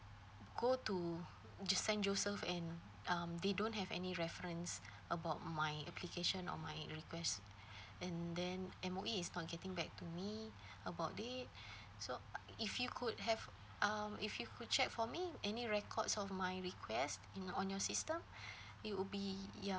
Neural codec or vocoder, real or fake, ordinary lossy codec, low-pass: none; real; none; none